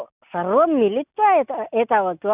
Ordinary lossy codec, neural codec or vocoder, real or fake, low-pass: none; none; real; 3.6 kHz